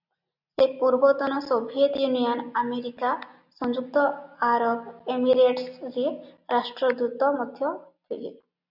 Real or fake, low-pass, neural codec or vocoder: real; 5.4 kHz; none